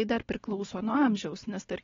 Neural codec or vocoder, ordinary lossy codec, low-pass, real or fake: none; AAC, 24 kbps; 7.2 kHz; real